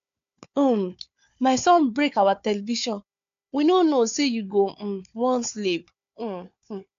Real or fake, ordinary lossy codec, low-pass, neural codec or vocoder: fake; AAC, 64 kbps; 7.2 kHz; codec, 16 kHz, 4 kbps, FunCodec, trained on Chinese and English, 50 frames a second